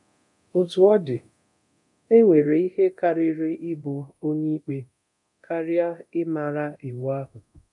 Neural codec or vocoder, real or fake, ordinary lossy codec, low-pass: codec, 24 kHz, 0.9 kbps, DualCodec; fake; none; 10.8 kHz